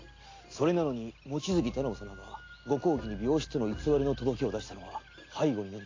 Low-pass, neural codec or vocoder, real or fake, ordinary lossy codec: 7.2 kHz; none; real; AAC, 32 kbps